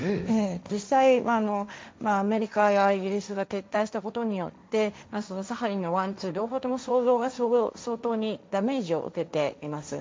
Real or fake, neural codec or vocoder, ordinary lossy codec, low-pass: fake; codec, 16 kHz, 1.1 kbps, Voila-Tokenizer; none; none